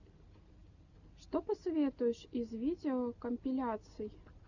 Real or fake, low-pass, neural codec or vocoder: real; 7.2 kHz; none